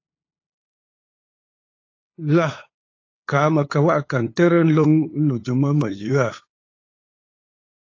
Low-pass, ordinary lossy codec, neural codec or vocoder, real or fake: 7.2 kHz; AAC, 32 kbps; codec, 16 kHz, 8 kbps, FunCodec, trained on LibriTTS, 25 frames a second; fake